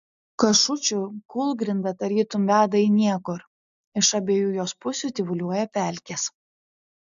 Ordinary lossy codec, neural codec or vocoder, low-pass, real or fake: AAC, 96 kbps; none; 7.2 kHz; real